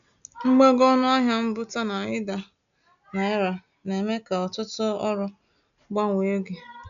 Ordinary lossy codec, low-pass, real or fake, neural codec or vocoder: none; 7.2 kHz; real; none